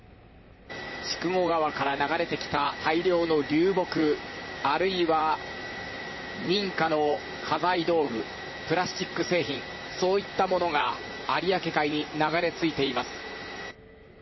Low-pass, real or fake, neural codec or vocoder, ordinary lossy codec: 7.2 kHz; fake; vocoder, 44.1 kHz, 128 mel bands, Pupu-Vocoder; MP3, 24 kbps